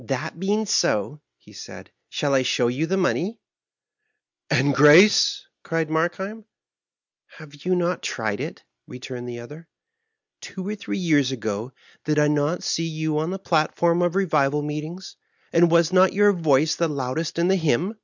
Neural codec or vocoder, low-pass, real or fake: none; 7.2 kHz; real